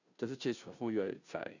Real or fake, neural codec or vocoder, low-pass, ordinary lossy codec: fake; codec, 16 kHz, 0.5 kbps, FunCodec, trained on Chinese and English, 25 frames a second; 7.2 kHz; none